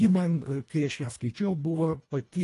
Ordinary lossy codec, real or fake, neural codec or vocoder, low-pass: AAC, 48 kbps; fake; codec, 24 kHz, 1.5 kbps, HILCodec; 10.8 kHz